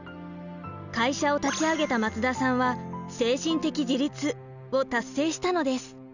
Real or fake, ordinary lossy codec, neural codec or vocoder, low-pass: real; none; none; 7.2 kHz